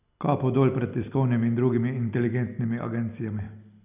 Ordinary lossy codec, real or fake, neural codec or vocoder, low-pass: none; real; none; 3.6 kHz